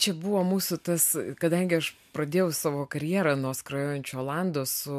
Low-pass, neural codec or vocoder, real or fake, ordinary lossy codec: 14.4 kHz; none; real; MP3, 96 kbps